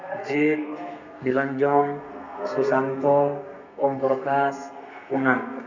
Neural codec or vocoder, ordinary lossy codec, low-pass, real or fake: codec, 32 kHz, 1.9 kbps, SNAC; none; 7.2 kHz; fake